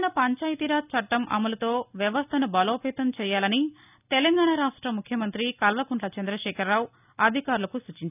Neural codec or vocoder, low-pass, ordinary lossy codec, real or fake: none; 3.6 kHz; none; real